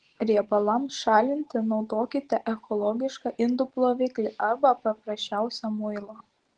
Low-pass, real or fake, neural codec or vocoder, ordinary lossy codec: 9.9 kHz; real; none; Opus, 16 kbps